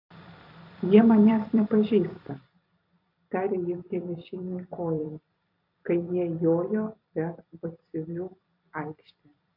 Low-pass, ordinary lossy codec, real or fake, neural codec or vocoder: 5.4 kHz; AAC, 48 kbps; fake; vocoder, 44.1 kHz, 128 mel bands every 256 samples, BigVGAN v2